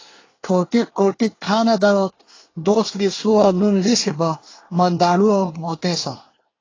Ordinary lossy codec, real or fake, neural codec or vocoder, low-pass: AAC, 32 kbps; fake; codec, 24 kHz, 1 kbps, SNAC; 7.2 kHz